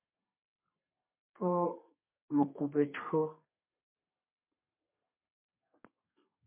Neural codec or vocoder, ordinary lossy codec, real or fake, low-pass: codec, 32 kHz, 1.9 kbps, SNAC; MP3, 32 kbps; fake; 3.6 kHz